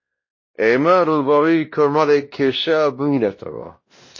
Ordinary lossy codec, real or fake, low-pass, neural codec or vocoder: MP3, 32 kbps; fake; 7.2 kHz; codec, 16 kHz, 1 kbps, X-Codec, WavLM features, trained on Multilingual LibriSpeech